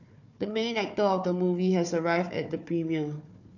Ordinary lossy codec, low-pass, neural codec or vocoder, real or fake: none; 7.2 kHz; codec, 16 kHz, 4 kbps, FunCodec, trained on Chinese and English, 50 frames a second; fake